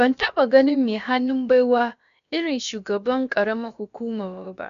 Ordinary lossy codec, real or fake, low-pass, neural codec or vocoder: none; fake; 7.2 kHz; codec, 16 kHz, about 1 kbps, DyCAST, with the encoder's durations